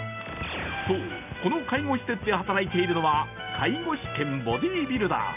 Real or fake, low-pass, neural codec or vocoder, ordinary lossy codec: real; 3.6 kHz; none; none